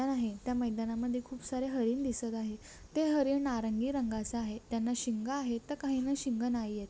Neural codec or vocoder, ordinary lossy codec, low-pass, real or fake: none; none; none; real